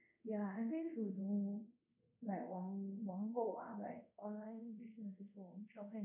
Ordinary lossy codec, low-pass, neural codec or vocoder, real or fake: none; 3.6 kHz; codec, 24 kHz, 0.5 kbps, DualCodec; fake